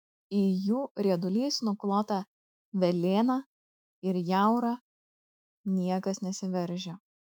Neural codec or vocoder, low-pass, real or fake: autoencoder, 48 kHz, 128 numbers a frame, DAC-VAE, trained on Japanese speech; 19.8 kHz; fake